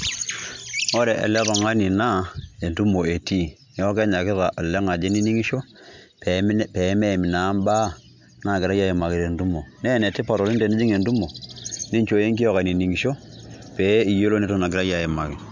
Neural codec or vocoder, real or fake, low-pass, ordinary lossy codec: none; real; 7.2 kHz; MP3, 64 kbps